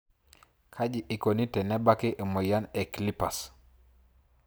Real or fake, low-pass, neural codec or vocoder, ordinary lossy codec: real; none; none; none